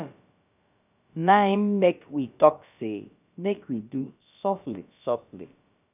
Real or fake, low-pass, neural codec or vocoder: fake; 3.6 kHz; codec, 16 kHz, about 1 kbps, DyCAST, with the encoder's durations